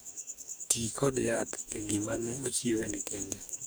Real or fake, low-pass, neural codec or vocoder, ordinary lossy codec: fake; none; codec, 44.1 kHz, 2.6 kbps, DAC; none